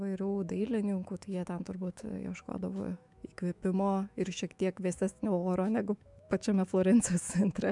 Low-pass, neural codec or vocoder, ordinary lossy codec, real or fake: 10.8 kHz; autoencoder, 48 kHz, 128 numbers a frame, DAC-VAE, trained on Japanese speech; MP3, 96 kbps; fake